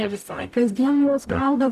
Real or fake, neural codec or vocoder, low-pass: fake; codec, 44.1 kHz, 0.9 kbps, DAC; 14.4 kHz